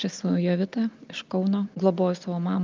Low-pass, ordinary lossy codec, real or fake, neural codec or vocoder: 7.2 kHz; Opus, 24 kbps; real; none